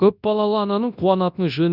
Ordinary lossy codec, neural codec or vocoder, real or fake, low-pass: none; codec, 24 kHz, 0.9 kbps, WavTokenizer, large speech release; fake; 5.4 kHz